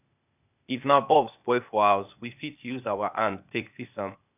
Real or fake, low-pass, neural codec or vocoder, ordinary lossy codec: fake; 3.6 kHz; codec, 16 kHz, 0.8 kbps, ZipCodec; none